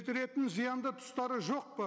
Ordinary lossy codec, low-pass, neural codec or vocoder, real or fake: none; none; none; real